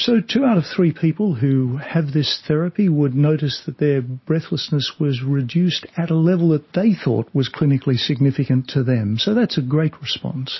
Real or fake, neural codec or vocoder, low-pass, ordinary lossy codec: real; none; 7.2 kHz; MP3, 24 kbps